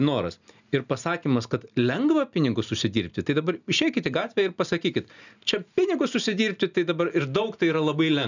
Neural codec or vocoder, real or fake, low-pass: none; real; 7.2 kHz